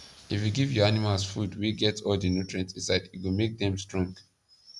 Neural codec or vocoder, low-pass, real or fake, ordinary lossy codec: none; none; real; none